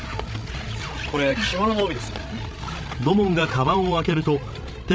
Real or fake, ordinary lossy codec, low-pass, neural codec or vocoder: fake; none; none; codec, 16 kHz, 16 kbps, FreqCodec, larger model